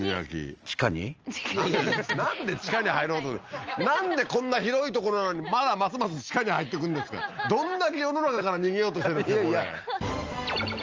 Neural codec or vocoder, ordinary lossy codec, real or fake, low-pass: none; Opus, 24 kbps; real; 7.2 kHz